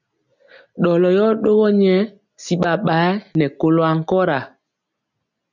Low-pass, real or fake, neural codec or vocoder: 7.2 kHz; real; none